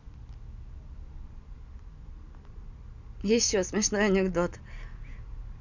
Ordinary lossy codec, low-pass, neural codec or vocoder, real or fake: none; 7.2 kHz; none; real